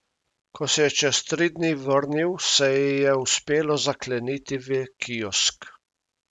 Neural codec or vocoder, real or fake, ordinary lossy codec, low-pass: none; real; none; none